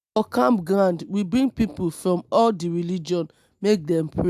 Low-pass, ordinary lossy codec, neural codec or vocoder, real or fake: 14.4 kHz; AAC, 96 kbps; none; real